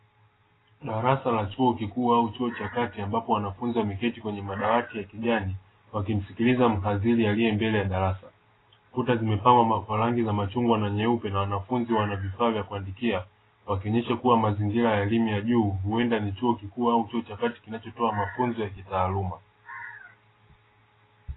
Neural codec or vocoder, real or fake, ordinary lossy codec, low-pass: none; real; AAC, 16 kbps; 7.2 kHz